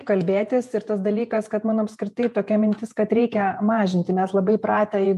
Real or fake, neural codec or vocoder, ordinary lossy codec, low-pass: fake; vocoder, 48 kHz, 128 mel bands, Vocos; MP3, 64 kbps; 14.4 kHz